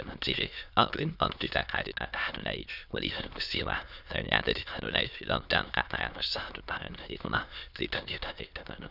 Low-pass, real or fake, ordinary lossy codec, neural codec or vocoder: 5.4 kHz; fake; none; autoencoder, 22.05 kHz, a latent of 192 numbers a frame, VITS, trained on many speakers